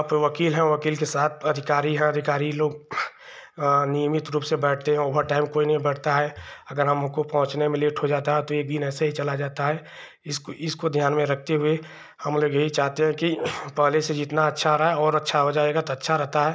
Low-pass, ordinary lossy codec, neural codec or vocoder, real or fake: none; none; none; real